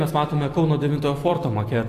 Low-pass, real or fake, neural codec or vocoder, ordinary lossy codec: 14.4 kHz; fake; vocoder, 44.1 kHz, 128 mel bands every 256 samples, BigVGAN v2; AAC, 64 kbps